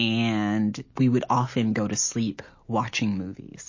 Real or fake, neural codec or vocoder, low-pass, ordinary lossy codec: real; none; 7.2 kHz; MP3, 32 kbps